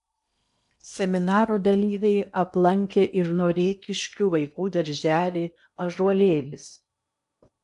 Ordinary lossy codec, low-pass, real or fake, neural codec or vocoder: AAC, 96 kbps; 10.8 kHz; fake; codec, 16 kHz in and 24 kHz out, 0.8 kbps, FocalCodec, streaming, 65536 codes